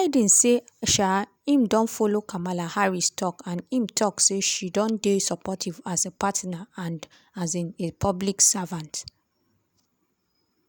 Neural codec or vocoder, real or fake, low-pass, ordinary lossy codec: none; real; none; none